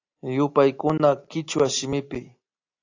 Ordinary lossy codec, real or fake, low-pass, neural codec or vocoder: AAC, 48 kbps; real; 7.2 kHz; none